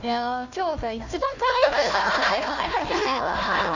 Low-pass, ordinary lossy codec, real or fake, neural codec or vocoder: 7.2 kHz; none; fake; codec, 16 kHz, 1 kbps, FunCodec, trained on Chinese and English, 50 frames a second